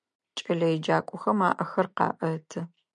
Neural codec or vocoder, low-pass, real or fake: none; 9.9 kHz; real